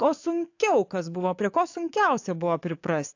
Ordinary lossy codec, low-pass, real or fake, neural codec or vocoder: AAC, 48 kbps; 7.2 kHz; fake; codec, 44.1 kHz, 7.8 kbps, DAC